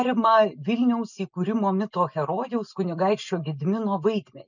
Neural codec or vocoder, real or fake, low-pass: none; real; 7.2 kHz